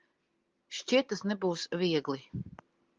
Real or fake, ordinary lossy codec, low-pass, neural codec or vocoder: real; Opus, 32 kbps; 7.2 kHz; none